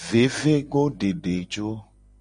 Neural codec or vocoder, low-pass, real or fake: none; 9.9 kHz; real